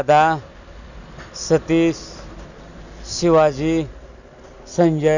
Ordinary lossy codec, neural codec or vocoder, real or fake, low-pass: none; none; real; 7.2 kHz